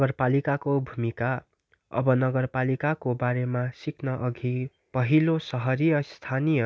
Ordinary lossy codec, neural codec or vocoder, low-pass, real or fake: none; none; none; real